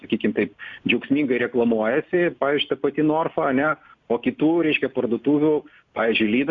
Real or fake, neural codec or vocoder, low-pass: real; none; 7.2 kHz